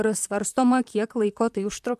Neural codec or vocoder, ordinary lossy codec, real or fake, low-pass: vocoder, 44.1 kHz, 128 mel bands, Pupu-Vocoder; MP3, 96 kbps; fake; 14.4 kHz